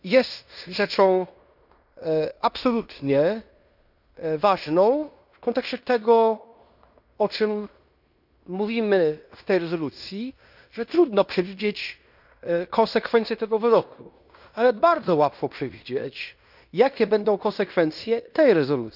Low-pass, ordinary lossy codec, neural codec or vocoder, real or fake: 5.4 kHz; none; codec, 16 kHz in and 24 kHz out, 0.9 kbps, LongCat-Audio-Codec, fine tuned four codebook decoder; fake